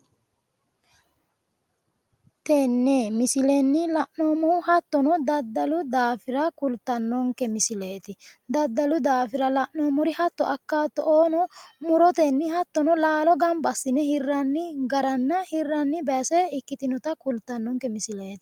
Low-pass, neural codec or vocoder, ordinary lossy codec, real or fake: 19.8 kHz; none; Opus, 32 kbps; real